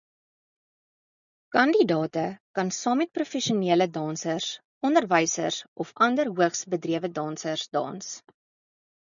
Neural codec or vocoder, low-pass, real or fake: none; 7.2 kHz; real